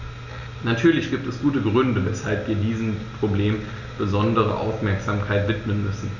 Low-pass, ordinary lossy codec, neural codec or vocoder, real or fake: 7.2 kHz; none; none; real